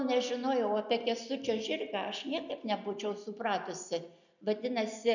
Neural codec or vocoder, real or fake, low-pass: none; real; 7.2 kHz